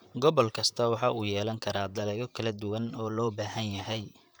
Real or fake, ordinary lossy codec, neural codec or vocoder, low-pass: fake; none; vocoder, 44.1 kHz, 128 mel bands, Pupu-Vocoder; none